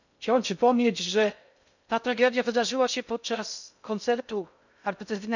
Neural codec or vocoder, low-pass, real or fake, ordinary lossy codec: codec, 16 kHz in and 24 kHz out, 0.6 kbps, FocalCodec, streaming, 2048 codes; 7.2 kHz; fake; none